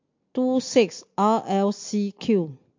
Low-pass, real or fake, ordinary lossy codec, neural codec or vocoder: 7.2 kHz; real; MP3, 48 kbps; none